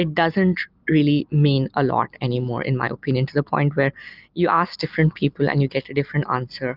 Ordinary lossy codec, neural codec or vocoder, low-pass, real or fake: Opus, 24 kbps; none; 5.4 kHz; real